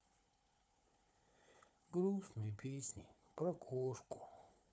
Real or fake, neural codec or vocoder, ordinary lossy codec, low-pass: fake; codec, 16 kHz, 4 kbps, FunCodec, trained on Chinese and English, 50 frames a second; none; none